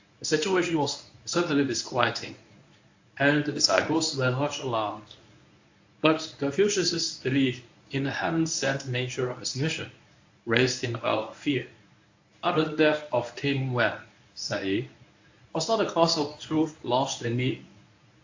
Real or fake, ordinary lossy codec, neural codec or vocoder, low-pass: fake; AAC, 48 kbps; codec, 24 kHz, 0.9 kbps, WavTokenizer, medium speech release version 1; 7.2 kHz